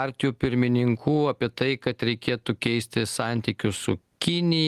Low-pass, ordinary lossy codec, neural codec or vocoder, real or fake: 14.4 kHz; Opus, 24 kbps; none; real